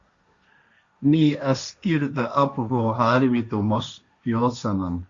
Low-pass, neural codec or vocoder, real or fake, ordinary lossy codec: 7.2 kHz; codec, 16 kHz, 1.1 kbps, Voila-Tokenizer; fake; Opus, 64 kbps